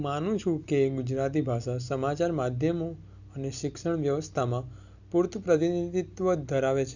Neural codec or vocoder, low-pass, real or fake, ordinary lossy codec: none; 7.2 kHz; real; none